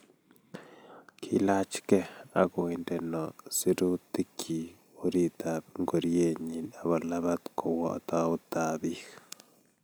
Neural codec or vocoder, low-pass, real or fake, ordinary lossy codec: none; none; real; none